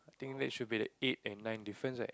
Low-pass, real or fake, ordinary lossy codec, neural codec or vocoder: none; real; none; none